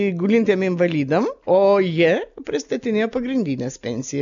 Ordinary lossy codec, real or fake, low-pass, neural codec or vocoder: MP3, 96 kbps; real; 7.2 kHz; none